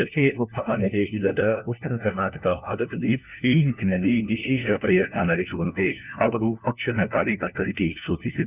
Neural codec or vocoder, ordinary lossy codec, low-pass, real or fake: codec, 16 kHz, 1 kbps, FunCodec, trained on LibriTTS, 50 frames a second; none; 3.6 kHz; fake